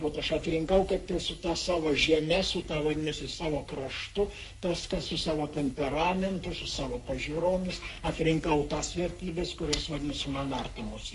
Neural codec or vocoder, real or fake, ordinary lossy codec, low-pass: codec, 44.1 kHz, 3.4 kbps, Pupu-Codec; fake; MP3, 48 kbps; 14.4 kHz